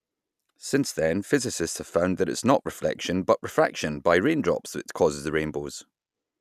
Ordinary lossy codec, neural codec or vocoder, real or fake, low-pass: none; none; real; 14.4 kHz